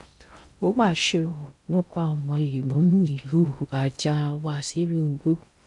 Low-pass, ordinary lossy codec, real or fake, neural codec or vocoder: 10.8 kHz; none; fake; codec, 16 kHz in and 24 kHz out, 0.6 kbps, FocalCodec, streaming, 2048 codes